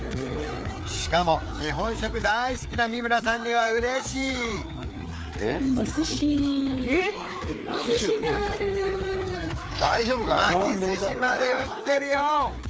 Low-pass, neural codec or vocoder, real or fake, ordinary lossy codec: none; codec, 16 kHz, 4 kbps, FreqCodec, larger model; fake; none